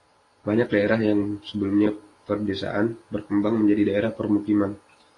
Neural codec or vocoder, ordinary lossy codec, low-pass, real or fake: vocoder, 44.1 kHz, 128 mel bands every 512 samples, BigVGAN v2; AAC, 32 kbps; 10.8 kHz; fake